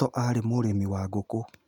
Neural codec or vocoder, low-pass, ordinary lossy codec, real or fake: none; 19.8 kHz; none; real